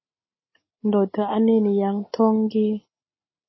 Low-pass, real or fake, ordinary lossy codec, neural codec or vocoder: 7.2 kHz; real; MP3, 24 kbps; none